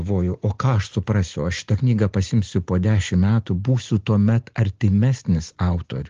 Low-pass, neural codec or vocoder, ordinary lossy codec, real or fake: 7.2 kHz; none; Opus, 16 kbps; real